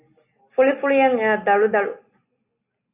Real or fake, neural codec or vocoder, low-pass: real; none; 3.6 kHz